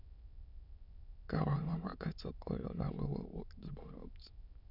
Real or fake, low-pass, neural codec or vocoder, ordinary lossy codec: fake; 5.4 kHz; autoencoder, 22.05 kHz, a latent of 192 numbers a frame, VITS, trained on many speakers; none